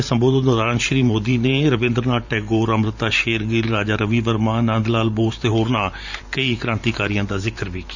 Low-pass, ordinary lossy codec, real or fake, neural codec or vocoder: 7.2 kHz; Opus, 64 kbps; fake; vocoder, 44.1 kHz, 128 mel bands every 512 samples, BigVGAN v2